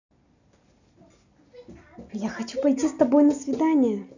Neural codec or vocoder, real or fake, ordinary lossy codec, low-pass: none; real; none; 7.2 kHz